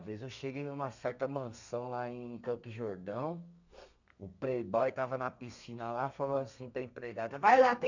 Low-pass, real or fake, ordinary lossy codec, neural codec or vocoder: 7.2 kHz; fake; none; codec, 32 kHz, 1.9 kbps, SNAC